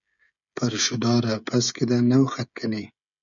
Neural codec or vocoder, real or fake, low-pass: codec, 16 kHz, 16 kbps, FreqCodec, smaller model; fake; 7.2 kHz